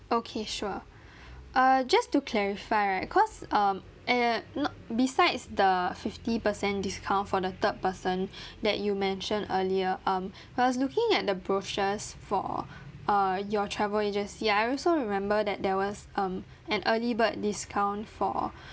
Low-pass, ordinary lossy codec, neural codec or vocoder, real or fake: none; none; none; real